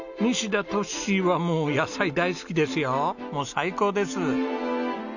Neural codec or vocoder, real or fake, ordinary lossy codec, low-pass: none; real; none; 7.2 kHz